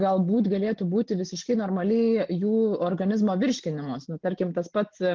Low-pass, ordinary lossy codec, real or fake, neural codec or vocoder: 7.2 kHz; Opus, 32 kbps; real; none